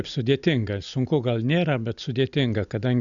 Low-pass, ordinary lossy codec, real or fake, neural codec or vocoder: 7.2 kHz; Opus, 64 kbps; real; none